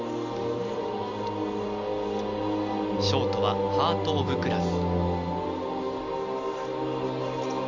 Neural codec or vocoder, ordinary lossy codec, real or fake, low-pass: none; none; real; 7.2 kHz